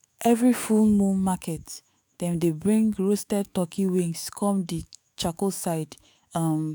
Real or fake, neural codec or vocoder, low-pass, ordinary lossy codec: fake; autoencoder, 48 kHz, 128 numbers a frame, DAC-VAE, trained on Japanese speech; none; none